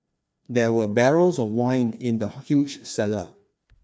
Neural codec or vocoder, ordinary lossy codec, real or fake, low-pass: codec, 16 kHz, 2 kbps, FreqCodec, larger model; none; fake; none